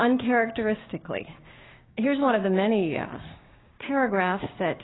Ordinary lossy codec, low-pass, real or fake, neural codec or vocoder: AAC, 16 kbps; 7.2 kHz; fake; codec, 16 kHz, 6 kbps, DAC